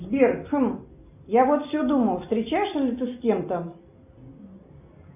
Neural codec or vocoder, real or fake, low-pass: none; real; 3.6 kHz